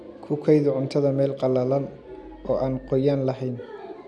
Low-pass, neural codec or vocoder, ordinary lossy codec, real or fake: none; none; none; real